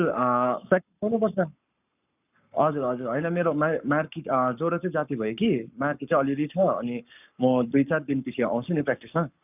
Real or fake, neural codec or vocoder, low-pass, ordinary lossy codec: fake; codec, 44.1 kHz, 7.8 kbps, Pupu-Codec; 3.6 kHz; none